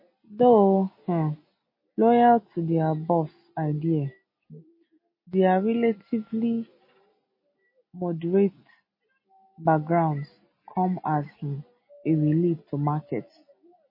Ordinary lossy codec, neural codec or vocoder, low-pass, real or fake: MP3, 24 kbps; none; 5.4 kHz; real